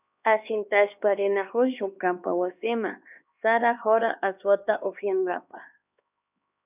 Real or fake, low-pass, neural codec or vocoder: fake; 3.6 kHz; codec, 16 kHz, 2 kbps, X-Codec, HuBERT features, trained on LibriSpeech